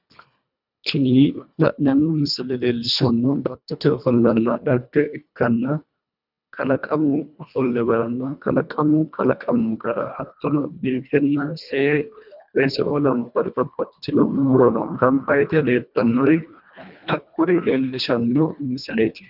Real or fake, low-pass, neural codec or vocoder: fake; 5.4 kHz; codec, 24 kHz, 1.5 kbps, HILCodec